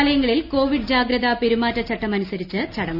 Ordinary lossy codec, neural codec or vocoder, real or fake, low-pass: none; none; real; 5.4 kHz